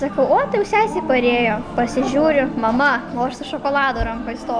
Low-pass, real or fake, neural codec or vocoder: 9.9 kHz; real; none